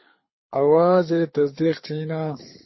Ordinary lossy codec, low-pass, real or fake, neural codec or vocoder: MP3, 24 kbps; 7.2 kHz; fake; codec, 24 kHz, 6 kbps, HILCodec